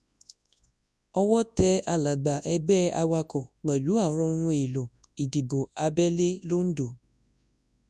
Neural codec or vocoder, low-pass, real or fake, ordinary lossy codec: codec, 24 kHz, 0.9 kbps, WavTokenizer, large speech release; none; fake; none